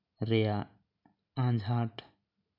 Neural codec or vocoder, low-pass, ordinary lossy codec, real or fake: none; 5.4 kHz; none; real